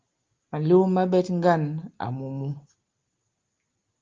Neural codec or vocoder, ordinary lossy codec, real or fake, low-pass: none; Opus, 24 kbps; real; 7.2 kHz